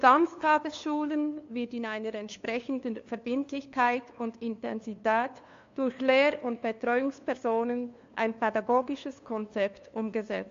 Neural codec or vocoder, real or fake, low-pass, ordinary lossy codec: codec, 16 kHz, 2 kbps, FunCodec, trained on LibriTTS, 25 frames a second; fake; 7.2 kHz; AAC, 48 kbps